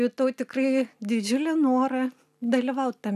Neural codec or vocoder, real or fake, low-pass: none; real; 14.4 kHz